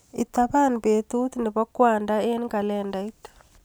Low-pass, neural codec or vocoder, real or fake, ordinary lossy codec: none; none; real; none